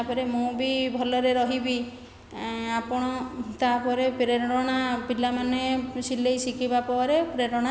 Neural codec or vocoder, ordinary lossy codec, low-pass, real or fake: none; none; none; real